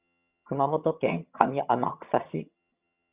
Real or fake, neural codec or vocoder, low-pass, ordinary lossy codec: fake; vocoder, 22.05 kHz, 80 mel bands, HiFi-GAN; 3.6 kHz; Opus, 64 kbps